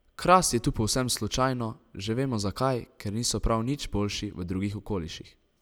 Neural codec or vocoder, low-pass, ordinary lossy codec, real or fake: none; none; none; real